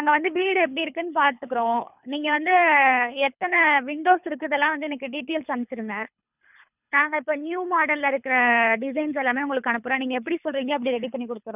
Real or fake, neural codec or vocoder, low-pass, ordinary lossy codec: fake; codec, 24 kHz, 3 kbps, HILCodec; 3.6 kHz; none